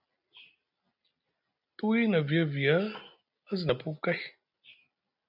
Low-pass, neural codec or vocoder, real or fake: 5.4 kHz; none; real